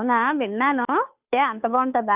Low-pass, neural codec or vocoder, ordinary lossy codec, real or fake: 3.6 kHz; codec, 16 kHz, 2 kbps, FunCodec, trained on Chinese and English, 25 frames a second; none; fake